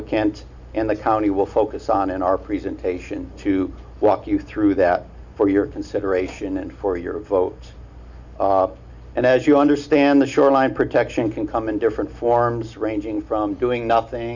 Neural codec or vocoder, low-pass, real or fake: none; 7.2 kHz; real